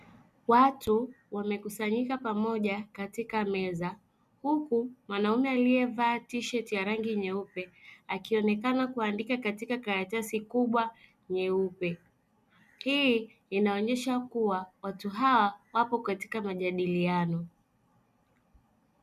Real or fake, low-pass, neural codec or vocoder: real; 14.4 kHz; none